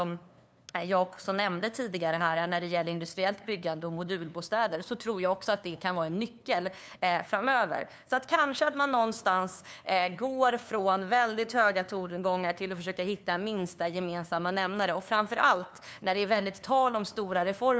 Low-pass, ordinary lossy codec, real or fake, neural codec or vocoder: none; none; fake; codec, 16 kHz, 2 kbps, FunCodec, trained on LibriTTS, 25 frames a second